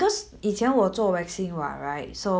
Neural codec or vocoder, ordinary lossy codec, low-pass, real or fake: none; none; none; real